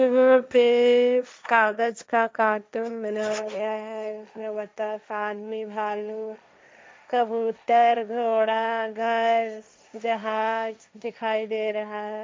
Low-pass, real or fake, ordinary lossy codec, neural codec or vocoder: none; fake; none; codec, 16 kHz, 1.1 kbps, Voila-Tokenizer